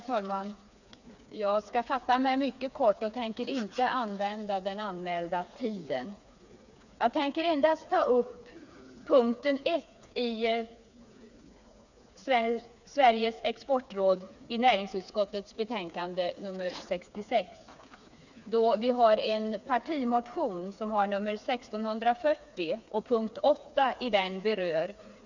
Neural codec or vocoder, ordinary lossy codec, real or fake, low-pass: codec, 16 kHz, 4 kbps, FreqCodec, smaller model; none; fake; 7.2 kHz